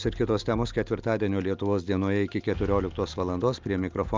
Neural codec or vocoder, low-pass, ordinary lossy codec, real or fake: none; 7.2 kHz; Opus, 24 kbps; real